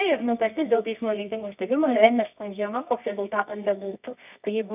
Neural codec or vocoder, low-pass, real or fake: codec, 24 kHz, 0.9 kbps, WavTokenizer, medium music audio release; 3.6 kHz; fake